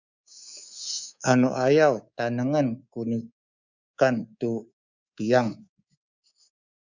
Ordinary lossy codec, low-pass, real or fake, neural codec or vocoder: Opus, 64 kbps; 7.2 kHz; fake; codec, 24 kHz, 3.1 kbps, DualCodec